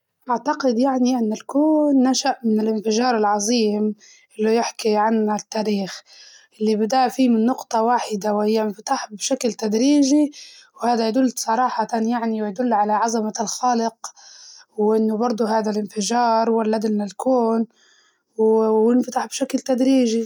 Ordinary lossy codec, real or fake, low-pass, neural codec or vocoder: none; real; 19.8 kHz; none